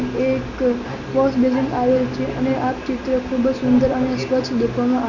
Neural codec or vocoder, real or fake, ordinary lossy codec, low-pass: none; real; none; 7.2 kHz